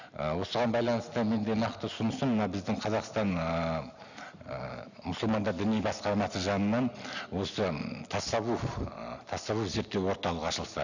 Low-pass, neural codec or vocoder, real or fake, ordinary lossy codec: 7.2 kHz; none; real; none